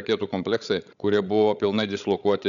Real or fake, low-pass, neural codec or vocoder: fake; 7.2 kHz; codec, 16 kHz, 16 kbps, FreqCodec, larger model